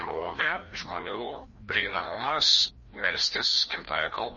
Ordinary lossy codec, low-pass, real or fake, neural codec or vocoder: MP3, 32 kbps; 7.2 kHz; fake; codec, 16 kHz, 1 kbps, FreqCodec, larger model